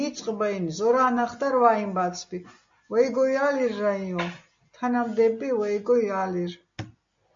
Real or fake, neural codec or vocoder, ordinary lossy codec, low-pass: real; none; MP3, 48 kbps; 7.2 kHz